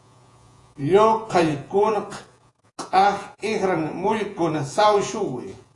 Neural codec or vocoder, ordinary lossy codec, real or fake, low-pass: vocoder, 48 kHz, 128 mel bands, Vocos; AAC, 48 kbps; fake; 10.8 kHz